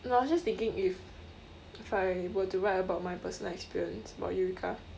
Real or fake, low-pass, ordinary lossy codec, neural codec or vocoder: real; none; none; none